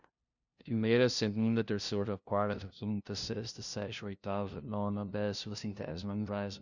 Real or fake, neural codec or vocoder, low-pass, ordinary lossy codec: fake; codec, 16 kHz, 0.5 kbps, FunCodec, trained on LibriTTS, 25 frames a second; 7.2 kHz; Opus, 64 kbps